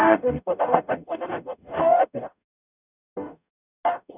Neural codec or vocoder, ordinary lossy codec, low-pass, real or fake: codec, 44.1 kHz, 0.9 kbps, DAC; none; 3.6 kHz; fake